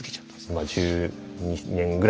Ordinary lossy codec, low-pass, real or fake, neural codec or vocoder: none; none; real; none